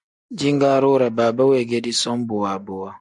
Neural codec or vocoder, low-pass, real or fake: none; 10.8 kHz; real